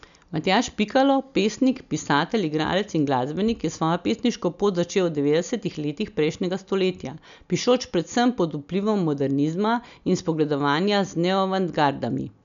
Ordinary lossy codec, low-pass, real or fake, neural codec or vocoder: none; 7.2 kHz; real; none